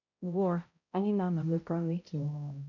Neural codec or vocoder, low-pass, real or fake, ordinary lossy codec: codec, 16 kHz, 0.5 kbps, X-Codec, HuBERT features, trained on balanced general audio; 7.2 kHz; fake; none